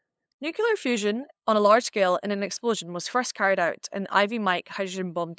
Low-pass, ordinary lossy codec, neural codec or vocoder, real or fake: none; none; codec, 16 kHz, 8 kbps, FunCodec, trained on LibriTTS, 25 frames a second; fake